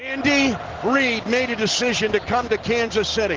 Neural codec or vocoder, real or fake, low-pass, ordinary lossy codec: none; real; 7.2 kHz; Opus, 16 kbps